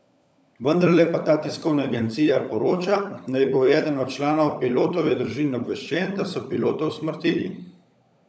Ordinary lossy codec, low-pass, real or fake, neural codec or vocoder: none; none; fake; codec, 16 kHz, 16 kbps, FunCodec, trained on LibriTTS, 50 frames a second